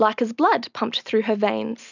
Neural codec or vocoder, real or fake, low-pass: none; real; 7.2 kHz